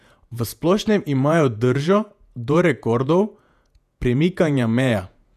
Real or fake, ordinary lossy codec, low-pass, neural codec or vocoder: fake; none; 14.4 kHz; vocoder, 48 kHz, 128 mel bands, Vocos